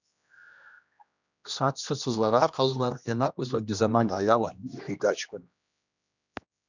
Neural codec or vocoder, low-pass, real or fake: codec, 16 kHz, 1 kbps, X-Codec, HuBERT features, trained on general audio; 7.2 kHz; fake